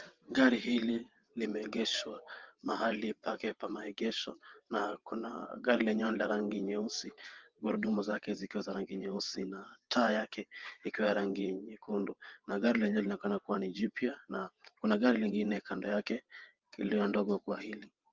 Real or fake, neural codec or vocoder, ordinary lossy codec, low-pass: fake; vocoder, 22.05 kHz, 80 mel bands, WaveNeXt; Opus, 32 kbps; 7.2 kHz